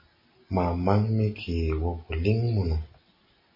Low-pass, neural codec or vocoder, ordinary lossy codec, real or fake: 5.4 kHz; none; MP3, 24 kbps; real